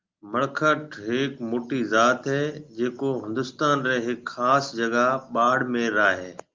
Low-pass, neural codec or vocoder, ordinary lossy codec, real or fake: 7.2 kHz; none; Opus, 32 kbps; real